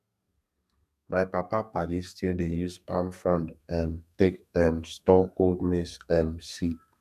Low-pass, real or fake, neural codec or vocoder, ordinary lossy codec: 14.4 kHz; fake; codec, 32 kHz, 1.9 kbps, SNAC; none